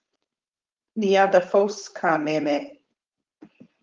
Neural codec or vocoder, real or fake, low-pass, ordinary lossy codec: codec, 16 kHz, 4.8 kbps, FACodec; fake; 7.2 kHz; Opus, 32 kbps